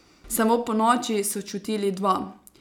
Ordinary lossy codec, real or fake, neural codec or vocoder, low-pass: none; real; none; 19.8 kHz